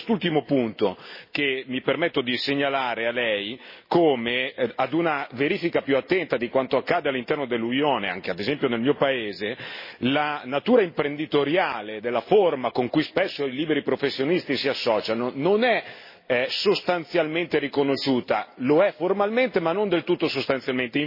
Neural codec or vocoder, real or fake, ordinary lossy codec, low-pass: none; real; MP3, 24 kbps; 5.4 kHz